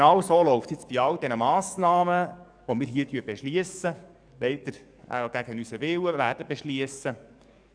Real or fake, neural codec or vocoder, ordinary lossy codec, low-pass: fake; codec, 44.1 kHz, 7.8 kbps, DAC; none; 9.9 kHz